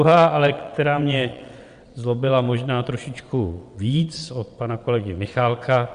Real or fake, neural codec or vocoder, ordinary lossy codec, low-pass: fake; vocoder, 22.05 kHz, 80 mel bands, WaveNeXt; Opus, 64 kbps; 9.9 kHz